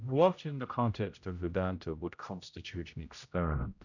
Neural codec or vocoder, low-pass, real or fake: codec, 16 kHz, 0.5 kbps, X-Codec, HuBERT features, trained on general audio; 7.2 kHz; fake